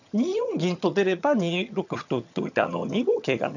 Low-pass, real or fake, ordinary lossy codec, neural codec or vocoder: 7.2 kHz; fake; none; vocoder, 22.05 kHz, 80 mel bands, HiFi-GAN